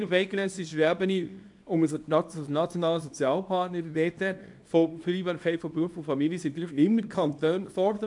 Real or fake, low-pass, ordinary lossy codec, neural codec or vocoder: fake; 10.8 kHz; AAC, 64 kbps; codec, 24 kHz, 0.9 kbps, WavTokenizer, small release